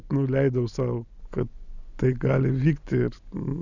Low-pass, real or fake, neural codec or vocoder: 7.2 kHz; real; none